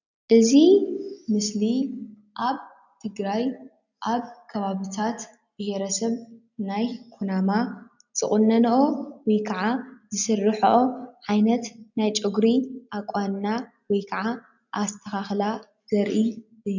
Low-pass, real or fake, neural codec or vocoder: 7.2 kHz; real; none